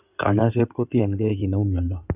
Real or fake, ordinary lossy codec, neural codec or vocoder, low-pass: fake; none; codec, 16 kHz in and 24 kHz out, 2.2 kbps, FireRedTTS-2 codec; 3.6 kHz